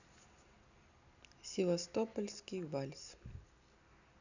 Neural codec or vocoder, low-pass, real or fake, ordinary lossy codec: none; 7.2 kHz; real; none